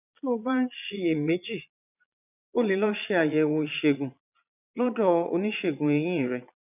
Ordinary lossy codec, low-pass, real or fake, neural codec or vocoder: none; 3.6 kHz; fake; vocoder, 22.05 kHz, 80 mel bands, Vocos